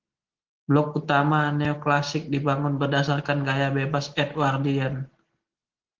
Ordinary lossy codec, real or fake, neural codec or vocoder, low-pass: Opus, 16 kbps; real; none; 7.2 kHz